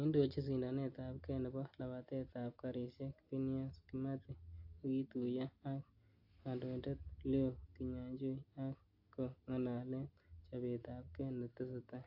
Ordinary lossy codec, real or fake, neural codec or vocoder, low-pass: AAC, 48 kbps; real; none; 5.4 kHz